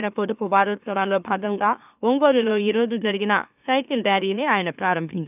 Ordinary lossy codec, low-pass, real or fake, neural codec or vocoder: none; 3.6 kHz; fake; autoencoder, 44.1 kHz, a latent of 192 numbers a frame, MeloTTS